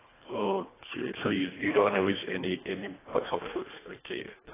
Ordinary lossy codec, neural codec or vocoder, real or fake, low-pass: AAC, 16 kbps; codec, 24 kHz, 1.5 kbps, HILCodec; fake; 3.6 kHz